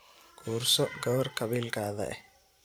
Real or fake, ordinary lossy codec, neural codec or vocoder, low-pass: fake; none; vocoder, 44.1 kHz, 128 mel bands every 512 samples, BigVGAN v2; none